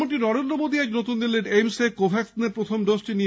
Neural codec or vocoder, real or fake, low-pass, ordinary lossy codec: none; real; none; none